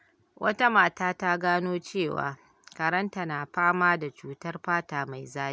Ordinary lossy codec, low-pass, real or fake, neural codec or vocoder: none; none; real; none